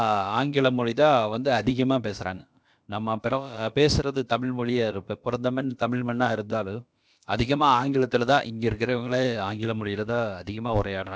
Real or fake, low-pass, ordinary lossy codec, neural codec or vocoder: fake; none; none; codec, 16 kHz, about 1 kbps, DyCAST, with the encoder's durations